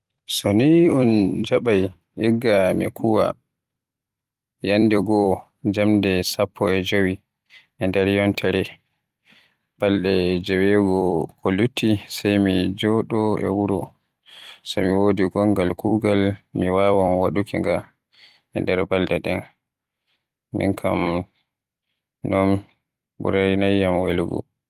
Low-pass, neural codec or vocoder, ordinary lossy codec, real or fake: 14.4 kHz; vocoder, 44.1 kHz, 128 mel bands every 256 samples, BigVGAN v2; Opus, 32 kbps; fake